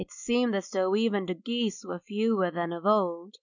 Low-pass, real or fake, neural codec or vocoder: 7.2 kHz; real; none